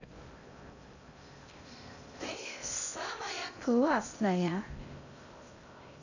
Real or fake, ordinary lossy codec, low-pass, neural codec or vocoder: fake; none; 7.2 kHz; codec, 16 kHz in and 24 kHz out, 0.6 kbps, FocalCodec, streaming, 2048 codes